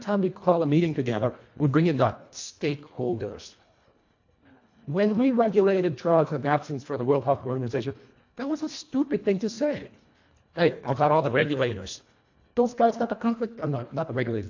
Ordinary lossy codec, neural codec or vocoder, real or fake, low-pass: AAC, 48 kbps; codec, 24 kHz, 1.5 kbps, HILCodec; fake; 7.2 kHz